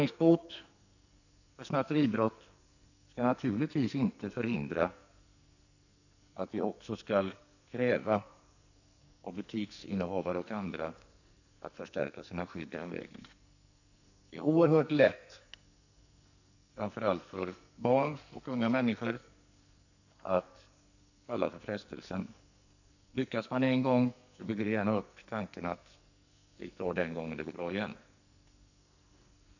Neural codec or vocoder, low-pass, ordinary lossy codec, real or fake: codec, 44.1 kHz, 2.6 kbps, SNAC; 7.2 kHz; none; fake